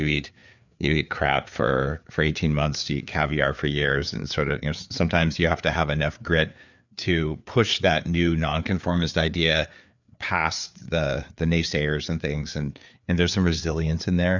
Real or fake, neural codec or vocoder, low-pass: fake; codec, 16 kHz, 4 kbps, FreqCodec, larger model; 7.2 kHz